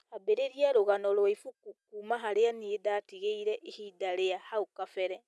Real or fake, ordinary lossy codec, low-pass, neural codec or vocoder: real; none; none; none